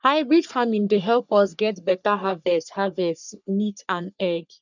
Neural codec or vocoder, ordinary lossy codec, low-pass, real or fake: codec, 44.1 kHz, 3.4 kbps, Pupu-Codec; none; 7.2 kHz; fake